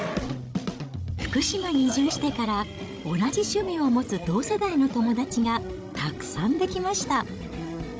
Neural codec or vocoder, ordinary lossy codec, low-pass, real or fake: codec, 16 kHz, 16 kbps, FreqCodec, larger model; none; none; fake